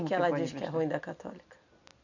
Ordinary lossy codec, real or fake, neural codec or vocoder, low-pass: none; real; none; 7.2 kHz